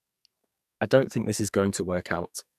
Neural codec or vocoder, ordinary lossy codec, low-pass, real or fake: codec, 44.1 kHz, 2.6 kbps, SNAC; none; 14.4 kHz; fake